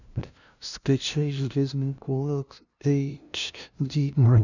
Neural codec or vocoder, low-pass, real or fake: codec, 16 kHz, 0.5 kbps, FunCodec, trained on LibriTTS, 25 frames a second; 7.2 kHz; fake